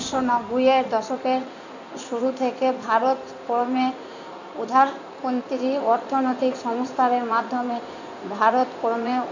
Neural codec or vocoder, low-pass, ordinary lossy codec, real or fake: codec, 16 kHz in and 24 kHz out, 2.2 kbps, FireRedTTS-2 codec; 7.2 kHz; none; fake